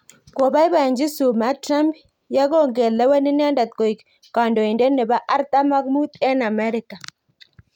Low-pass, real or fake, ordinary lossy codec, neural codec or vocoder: 19.8 kHz; real; none; none